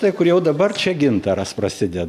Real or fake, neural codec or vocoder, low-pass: real; none; 14.4 kHz